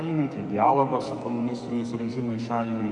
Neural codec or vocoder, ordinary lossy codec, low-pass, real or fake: codec, 44.1 kHz, 2.6 kbps, SNAC; Opus, 64 kbps; 10.8 kHz; fake